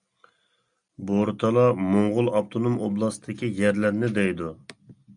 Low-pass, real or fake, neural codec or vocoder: 9.9 kHz; real; none